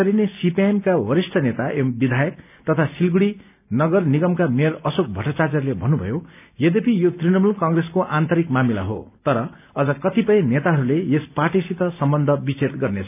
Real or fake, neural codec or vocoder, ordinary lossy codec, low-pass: real; none; none; 3.6 kHz